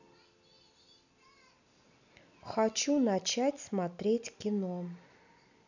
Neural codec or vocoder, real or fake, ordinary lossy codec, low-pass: none; real; none; 7.2 kHz